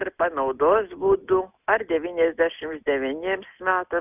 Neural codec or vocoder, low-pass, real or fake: none; 3.6 kHz; real